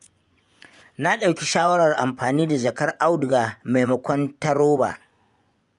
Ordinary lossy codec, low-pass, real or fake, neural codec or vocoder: none; 10.8 kHz; fake; vocoder, 24 kHz, 100 mel bands, Vocos